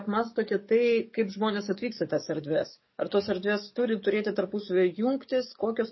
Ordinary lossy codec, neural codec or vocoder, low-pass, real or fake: MP3, 24 kbps; codec, 44.1 kHz, 7.8 kbps, DAC; 7.2 kHz; fake